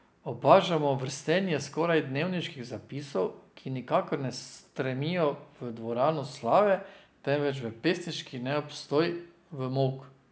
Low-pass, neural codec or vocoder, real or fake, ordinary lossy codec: none; none; real; none